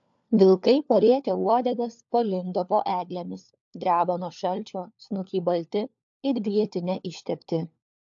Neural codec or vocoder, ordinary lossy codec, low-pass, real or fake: codec, 16 kHz, 4 kbps, FunCodec, trained on LibriTTS, 50 frames a second; AAC, 64 kbps; 7.2 kHz; fake